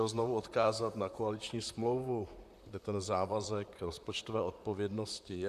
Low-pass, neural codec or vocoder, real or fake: 14.4 kHz; vocoder, 44.1 kHz, 128 mel bands, Pupu-Vocoder; fake